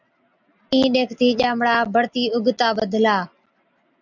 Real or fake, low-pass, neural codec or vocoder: real; 7.2 kHz; none